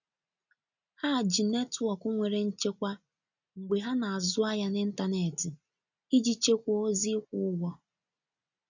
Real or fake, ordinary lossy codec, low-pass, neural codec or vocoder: real; none; 7.2 kHz; none